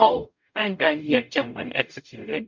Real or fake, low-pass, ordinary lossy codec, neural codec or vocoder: fake; 7.2 kHz; MP3, 64 kbps; codec, 44.1 kHz, 0.9 kbps, DAC